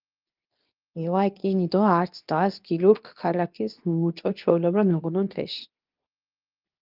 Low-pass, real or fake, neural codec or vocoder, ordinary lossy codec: 5.4 kHz; fake; codec, 24 kHz, 0.9 kbps, WavTokenizer, medium speech release version 2; Opus, 24 kbps